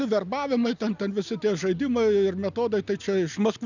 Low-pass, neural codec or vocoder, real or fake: 7.2 kHz; none; real